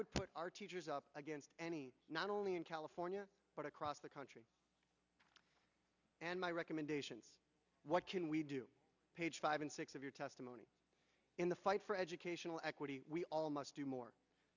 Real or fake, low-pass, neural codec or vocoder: real; 7.2 kHz; none